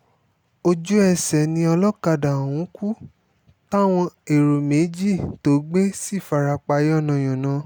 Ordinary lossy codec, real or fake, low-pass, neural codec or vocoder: none; real; none; none